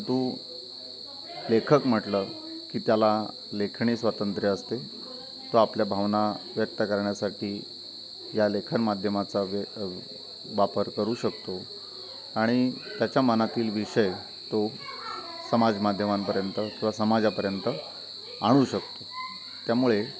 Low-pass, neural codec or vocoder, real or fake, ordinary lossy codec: none; none; real; none